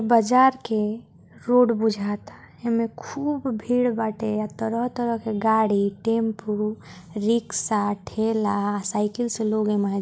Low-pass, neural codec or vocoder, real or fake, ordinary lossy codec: none; none; real; none